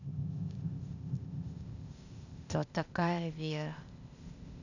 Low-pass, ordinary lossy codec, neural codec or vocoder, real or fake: 7.2 kHz; none; codec, 16 kHz, 0.8 kbps, ZipCodec; fake